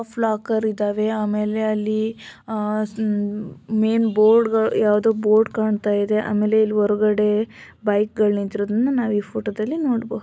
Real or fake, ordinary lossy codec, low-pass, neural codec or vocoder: real; none; none; none